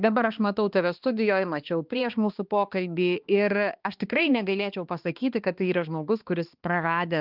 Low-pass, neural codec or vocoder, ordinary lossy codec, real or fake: 5.4 kHz; codec, 16 kHz, 2 kbps, X-Codec, HuBERT features, trained on balanced general audio; Opus, 24 kbps; fake